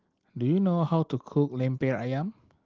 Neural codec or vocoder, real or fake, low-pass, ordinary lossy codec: none; real; 7.2 kHz; Opus, 16 kbps